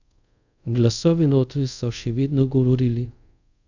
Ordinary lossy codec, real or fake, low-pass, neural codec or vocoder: none; fake; 7.2 kHz; codec, 24 kHz, 0.5 kbps, DualCodec